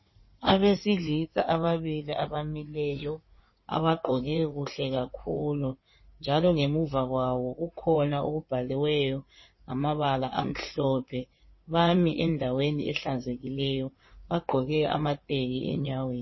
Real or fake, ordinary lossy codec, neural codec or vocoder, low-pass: fake; MP3, 24 kbps; codec, 16 kHz in and 24 kHz out, 2.2 kbps, FireRedTTS-2 codec; 7.2 kHz